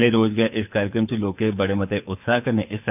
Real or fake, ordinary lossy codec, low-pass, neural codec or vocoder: fake; none; 3.6 kHz; codec, 24 kHz, 6 kbps, HILCodec